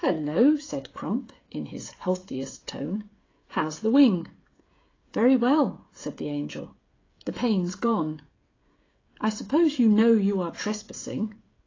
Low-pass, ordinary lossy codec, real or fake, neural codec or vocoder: 7.2 kHz; AAC, 32 kbps; fake; codec, 16 kHz, 16 kbps, FreqCodec, smaller model